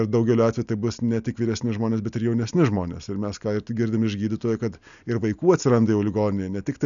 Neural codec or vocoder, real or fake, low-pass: none; real; 7.2 kHz